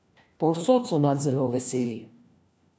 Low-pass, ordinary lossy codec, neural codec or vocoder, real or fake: none; none; codec, 16 kHz, 1 kbps, FunCodec, trained on LibriTTS, 50 frames a second; fake